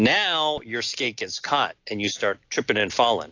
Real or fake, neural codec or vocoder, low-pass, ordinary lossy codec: real; none; 7.2 kHz; AAC, 48 kbps